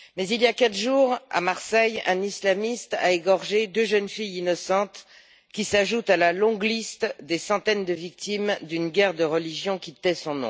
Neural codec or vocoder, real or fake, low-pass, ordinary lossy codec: none; real; none; none